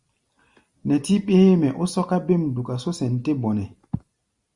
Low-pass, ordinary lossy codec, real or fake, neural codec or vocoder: 10.8 kHz; Opus, 64 kbps; real; none